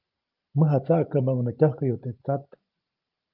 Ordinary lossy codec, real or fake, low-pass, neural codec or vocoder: Opus, 24 kbps; fake; 5.4 kHz; vocoder, 44.1 kHz, 128 mel bands every 512 samples, BigVGAN v2